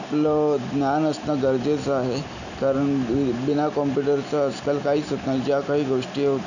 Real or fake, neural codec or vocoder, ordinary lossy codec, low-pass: real; none; none; 7.2 kHz